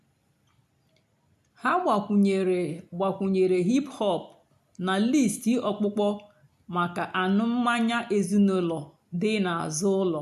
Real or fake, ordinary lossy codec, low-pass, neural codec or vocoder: real; AAC, 96 kbps; 14.4 kHz; none